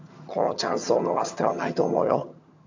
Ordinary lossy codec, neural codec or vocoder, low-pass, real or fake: AAC, 48 kbps; vocoder, 22.05 kHz, 80 mel bands, HiFi-GAN; 7.2 kHz; fake